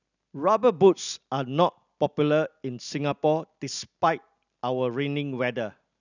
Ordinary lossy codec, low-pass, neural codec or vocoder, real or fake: none; 7.2 kHz; none; real